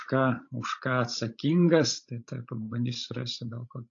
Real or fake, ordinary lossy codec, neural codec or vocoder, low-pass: fake; AAC, 48 kbps; codec, 16 kHz, 16 kbps, FreqCodec, larger model; 7.2 kHz